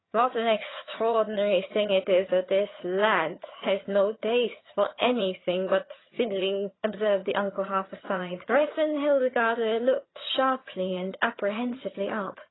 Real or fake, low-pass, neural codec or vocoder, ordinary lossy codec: fake; 7.2 kHz; vocoder, 22.05 kHz, 80 mel bands, HiFi-GAN; AAC, 16 kbps